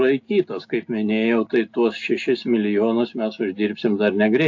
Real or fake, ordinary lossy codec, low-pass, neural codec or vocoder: real; AAC, 48 kbps; 7.2 kHz; none